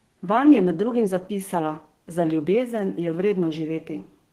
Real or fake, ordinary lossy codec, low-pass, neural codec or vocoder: fake; Opus, 16 kbps; 14.4 kHz; codec, 32 kHz, 1.9 kbps, SNAC